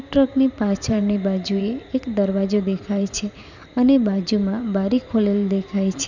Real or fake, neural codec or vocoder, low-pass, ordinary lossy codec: fake; vocoder, 44.1 kHz, 128 mel bands every 512 samples, BigVGAN v2; 7.2 kHz; none